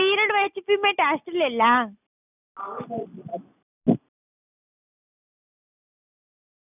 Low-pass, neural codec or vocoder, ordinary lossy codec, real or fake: 3.6 kHz; none; none; real